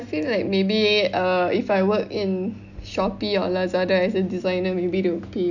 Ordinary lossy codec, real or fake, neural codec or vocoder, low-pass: none; real; none; 7.2 kHz